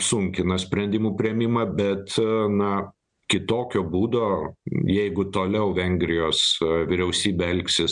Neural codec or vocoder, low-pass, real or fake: none; 9.9 kHz; real